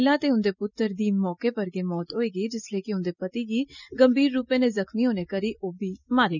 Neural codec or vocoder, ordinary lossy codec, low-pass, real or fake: none; none; 7.2 kHz; real